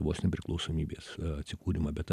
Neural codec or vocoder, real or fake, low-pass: none; real; 14.4 kHz